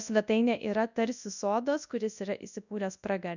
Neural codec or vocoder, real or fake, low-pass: codec, 24 kHz, 0.9 kbps, WavTokenizer, large speech release; fake; 7.2 kHz